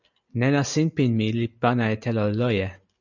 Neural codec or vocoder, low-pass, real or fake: none; 7.2 kHz; real